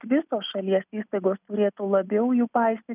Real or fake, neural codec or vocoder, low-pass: real; none; 3.6 kHz